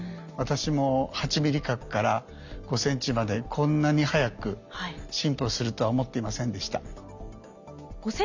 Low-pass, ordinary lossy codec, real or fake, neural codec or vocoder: 7.2 kHz; none; real; none